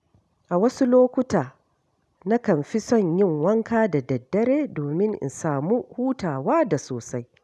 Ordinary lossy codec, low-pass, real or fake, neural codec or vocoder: none; none; real; none